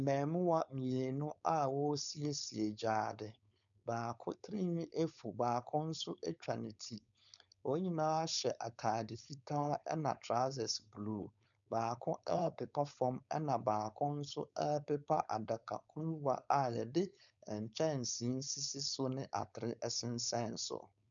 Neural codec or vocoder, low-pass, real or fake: codec, 16 kHz, 4.8 kbps, FACodec; 7.2 kHz; fake